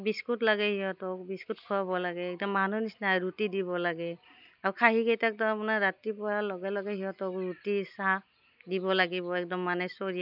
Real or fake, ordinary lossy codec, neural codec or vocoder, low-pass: real; none; none; 5.4 kHz